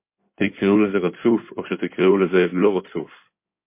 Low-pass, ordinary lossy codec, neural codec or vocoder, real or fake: 3.6 kHz; MP3, 24 kbps; codec, 16 kHz in and 24 kHz out, 2.2 kbps, FireRedTTS-2 codec; fake